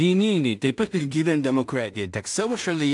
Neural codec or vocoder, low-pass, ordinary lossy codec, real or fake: codec, 16 kHz in and 24 kHz out, 0.4 kbps, LongCat-Audio-Codec, two codebook decoder; 10.8 kHz; AAC, 64 kbps; fake